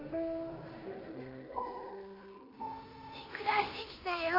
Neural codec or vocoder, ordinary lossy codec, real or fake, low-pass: codec, 16 kHz in and 24 kHz out, 0.9 kbps, LongCat-Audio-Codec, fine tuned four codebook decoder; MP3, 24 kbps; fake; 5.4 kHz